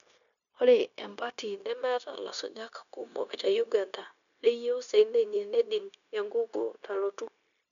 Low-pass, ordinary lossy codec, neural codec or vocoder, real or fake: 7.2 kHz; none; codec, 16 kHz, 0.9 kbps, LongCat-Audio-Codec; fake